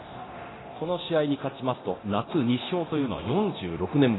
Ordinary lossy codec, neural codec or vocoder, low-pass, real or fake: AAC, 16 kbps; codec, 24 kHz, 0.9 kbps, DualCodec; 7.2 kHz; fake